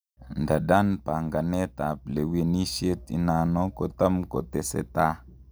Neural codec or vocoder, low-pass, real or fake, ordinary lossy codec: none; none; real; none